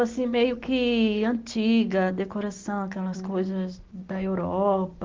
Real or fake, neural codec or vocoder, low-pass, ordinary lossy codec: real; none; 7.2 kHz; Opus, 16 kbps